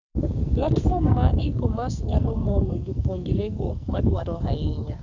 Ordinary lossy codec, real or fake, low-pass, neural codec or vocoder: none; fake; 7.2 kHz; codec, 44.1 kHz, 2.6 kbps, SNAC